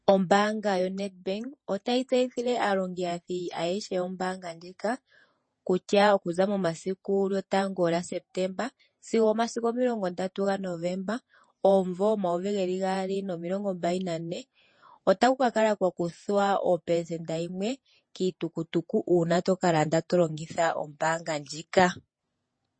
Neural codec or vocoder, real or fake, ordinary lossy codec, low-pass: vocoder, 48 kHz, 128 mel bands, Vocos; fake; MP3, 32 kbps; 9.9 kHz